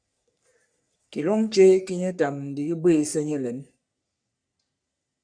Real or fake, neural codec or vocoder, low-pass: fake; codec, 44.1 kHz, 3.4 kbps, Pupu-Codec; 9.9 kHz